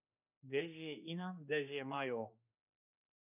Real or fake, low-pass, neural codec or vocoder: fake; 3.6 kHz; codec, 16 kHz, 2 kbps, X-Codec, HuBERT features, trained on general audio